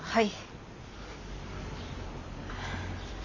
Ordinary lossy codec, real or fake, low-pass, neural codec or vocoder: none; real; 7.2 kHz; none